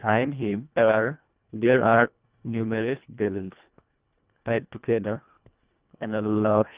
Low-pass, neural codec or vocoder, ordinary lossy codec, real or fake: 3.6 kHz; codec, 24 kHz, 1.5 kbps, HILCodec; Opus, 24 kbps; fake